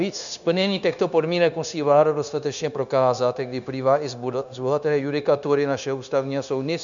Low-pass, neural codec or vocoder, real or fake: 7.2 kHz; codec, 16 kHz, 0.9 kbps, LongCat-Audio-Codec; fake